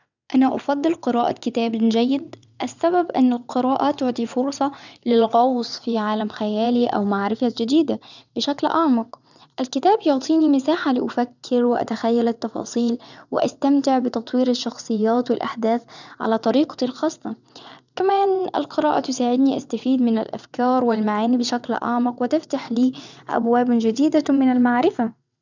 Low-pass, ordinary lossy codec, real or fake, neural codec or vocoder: 7.2 kHz; none; fake; vocoder, 22.05 kHz, 80 mel bands, WaveNeXt